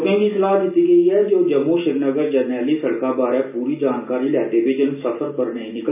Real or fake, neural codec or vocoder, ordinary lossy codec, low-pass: real; none; none; 3.6 kHz